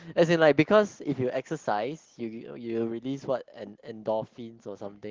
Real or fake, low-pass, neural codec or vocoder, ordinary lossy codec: real; 7.2 kHz; none; Opus, 16 kbps